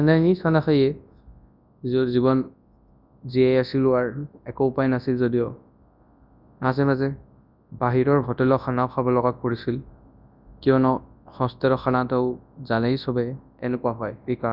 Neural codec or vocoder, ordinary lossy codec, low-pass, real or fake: codec, 24 kHz, 0.9 kbps, WavTokenizer, large speech release; none; 5.4 kHz; fake